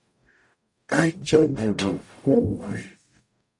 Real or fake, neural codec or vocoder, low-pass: fake; codec, 44.1 kHz, 0.9 kbps, DAC; 10.8 kHz